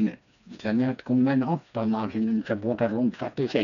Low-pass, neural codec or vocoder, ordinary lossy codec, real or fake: 7.2 kHz; codec, 16 kHz, 2 kbps, FreqCodec, smaller model; none; fake